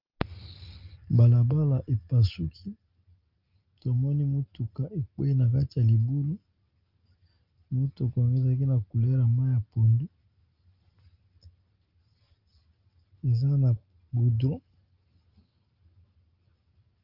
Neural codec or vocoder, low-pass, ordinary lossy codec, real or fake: none; 5.4 kHz; Opus, 24 kbps; real